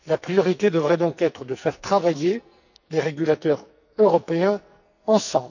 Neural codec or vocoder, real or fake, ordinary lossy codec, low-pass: codec, 44.1 kHz, 2.6 kbps, SNAC; fake; none; 7.2 kHz